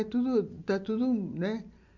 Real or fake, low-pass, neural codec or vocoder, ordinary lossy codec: real; 7.2 kHz; none; MP3, 64 kbps